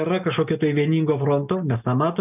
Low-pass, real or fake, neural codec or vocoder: 3.6 kHz; real; none